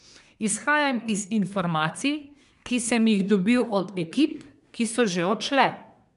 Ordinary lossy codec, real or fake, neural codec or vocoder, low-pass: none; fake; codec, 24 kHz, 1 kbps, SNAC; 10.8 kHz